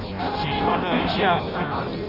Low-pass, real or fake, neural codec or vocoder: 5.4 kHz; fake; codec, 16 kHz in and 24 kHz out, 0.6 kbps, FireRedTTS-2 codec